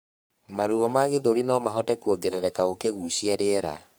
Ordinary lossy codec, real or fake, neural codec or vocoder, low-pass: none; fake; codec, 44.1 kHz, 3.4 kbps, Pupu-Codec; none